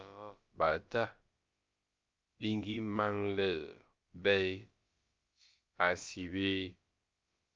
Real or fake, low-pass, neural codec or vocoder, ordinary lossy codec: fake; 7.2 kHz; codec, 16 kHz, about 1 kbps, DyCAST, with the encoder's durations; Opus, 24 kbps